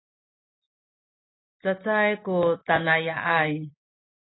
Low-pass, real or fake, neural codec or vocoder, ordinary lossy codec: 7.2 kHz; real; none; AAC, 16 kbps